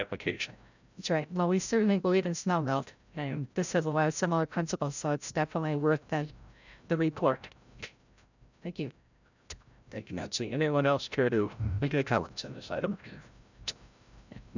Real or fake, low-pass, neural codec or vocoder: fake; 7.2 kHz; codec, 16 kHz, 0.5 kbps, FreqCodec, larger model